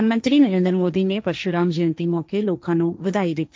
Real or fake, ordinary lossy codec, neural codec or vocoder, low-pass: fake; none; codec, 16 kHz, 1.1 kbps, Voila-Tokenizer; none